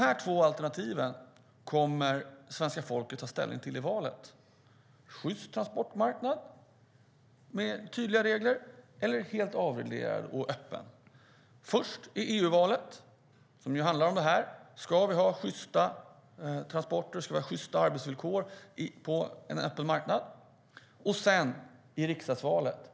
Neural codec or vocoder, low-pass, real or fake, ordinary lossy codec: none; none; real; none